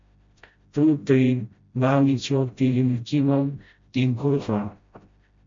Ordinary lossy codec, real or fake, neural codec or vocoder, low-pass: MP3, 48 kbps; fake; codec, 16 kHz, 0.5 kbps, FreqCodec, smaller model; 7.2 kHz